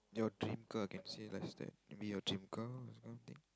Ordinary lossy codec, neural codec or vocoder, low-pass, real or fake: none; none; none; real